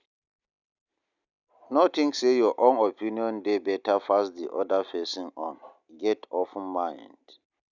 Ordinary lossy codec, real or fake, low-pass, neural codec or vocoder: none; real; 7.2 kHz; none